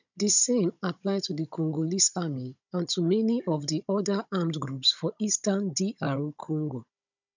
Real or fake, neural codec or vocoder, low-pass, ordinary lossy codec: fake; codec, 16 kHz, 16 kbps, FunCodec, trained on Chinese and English, 50 frames a second; 7.2 kHz; none